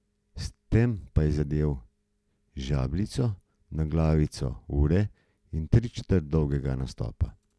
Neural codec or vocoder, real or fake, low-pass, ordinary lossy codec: none; real; none; none